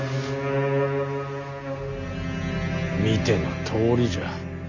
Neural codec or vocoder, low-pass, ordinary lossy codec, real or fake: none; 7.2 kHz; none; real